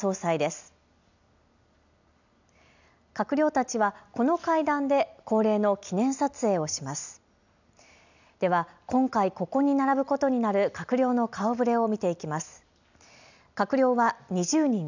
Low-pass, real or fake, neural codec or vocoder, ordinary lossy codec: 7.2 kHz; real; none; none